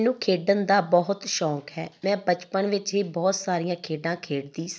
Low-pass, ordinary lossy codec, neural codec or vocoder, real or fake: none; none; none; real